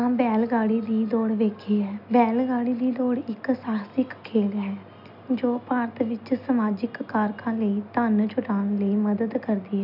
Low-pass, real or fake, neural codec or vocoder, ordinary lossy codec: 5.4 kHz; real; none; none